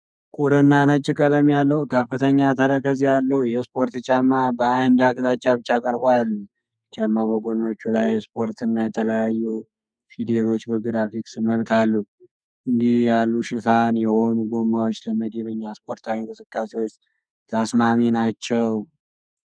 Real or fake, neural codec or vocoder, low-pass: fake; codec, 32 kHz, 1.9 kbps, SNAC; 9.9 kHz